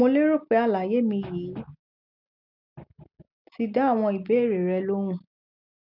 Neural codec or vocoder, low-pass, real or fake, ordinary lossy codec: none; 5.4 kHz; real; none